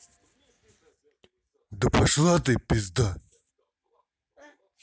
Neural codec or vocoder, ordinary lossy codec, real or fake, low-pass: none; none; real; none